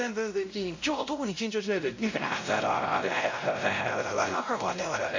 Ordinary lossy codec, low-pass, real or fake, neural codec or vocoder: MP3, 48 kbps; 7.2 kHz; fake; codec, 16 kHz, 0.5 kbps, X-Codec, WavLM features, trained on Multilingual LibriSpeech